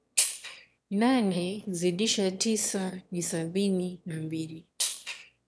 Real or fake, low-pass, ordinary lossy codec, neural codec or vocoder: fake; none; none; autoencoder, 22.05 kHz, a latent of 192 numbers a frame, VITS, trained on one speaker